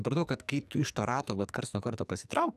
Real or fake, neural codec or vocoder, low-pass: fake; codec, 44.1 kHz, 2.6 kbps, SNAC; 14.4 kHz